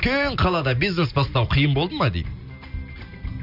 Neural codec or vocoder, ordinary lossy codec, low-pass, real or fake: none; none; 5.4 kHz; real